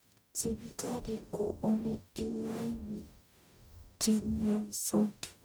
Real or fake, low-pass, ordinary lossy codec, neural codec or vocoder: fake; none; none; codec, 44.1 kHz, 0.9 kbps, DAC